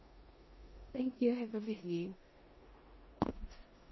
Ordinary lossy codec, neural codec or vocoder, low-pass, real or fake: MP3, 24 kbps; codec, 16 kHz in and 24 kHz out, 0.9 kbps, LongCat-Audio-Codec, four codebook decoder; 7.2 kHz; fake